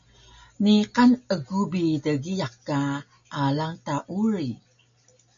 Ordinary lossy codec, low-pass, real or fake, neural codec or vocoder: MP3, 64 kbps; 7.2 kHz; real; none